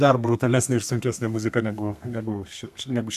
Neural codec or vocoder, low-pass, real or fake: codec, 32 kHz, 1.9 kbps, SNAC; 14.4 kHz; fake